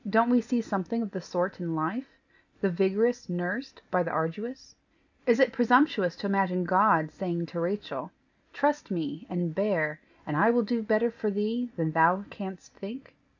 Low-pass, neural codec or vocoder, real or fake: 7.2 kHz; none; real